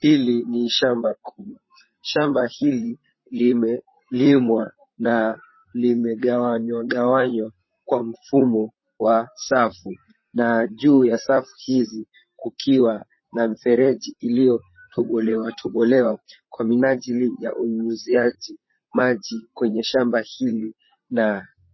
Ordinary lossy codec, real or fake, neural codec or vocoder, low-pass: MP3, 24 kbps; fake; vocoder, 44.1 kHz, 128 mel bands, Pupu-Vocoder; 7.2 kHz